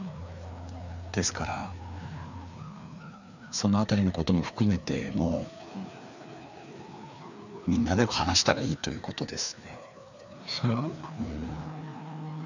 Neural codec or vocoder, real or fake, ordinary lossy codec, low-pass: codec, 16 kHz, 2 kbps, FreqCodec, larger model; fake; none; 7.2 kHz